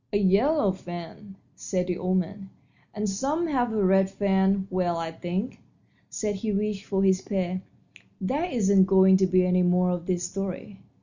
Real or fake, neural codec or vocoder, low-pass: real; none; 7.2 kHz